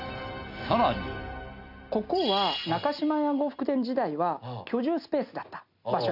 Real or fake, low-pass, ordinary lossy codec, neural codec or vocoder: real; 5.4 kHz; none; none